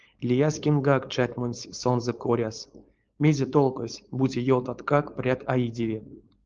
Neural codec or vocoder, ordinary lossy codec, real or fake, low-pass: codec, 16 kHz, 4.8 kbps, FACodec; Opus, 24 kbps; fake; 7.2 kHz